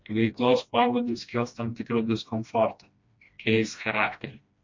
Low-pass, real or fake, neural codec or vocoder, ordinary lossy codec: 7.2 kHz; fake; codec, 16 kHz, 1 kbps, FreqCodec, smaller model; MP3, 48 kbps